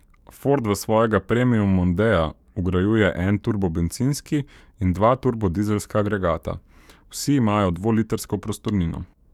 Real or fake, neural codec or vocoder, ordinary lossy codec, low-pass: fake; codec, 44.1 kHz, 7.8 kbps, DAC; none; 19.8 kHz